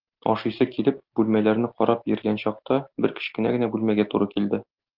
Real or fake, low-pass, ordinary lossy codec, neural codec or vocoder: real; 5.4 kHz; Opus, 32 kbps; none